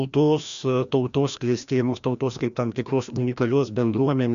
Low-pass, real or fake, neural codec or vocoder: 7.2 kHz; fake; codec, 16 kHz, 1 kbps, FreqCodec, larger model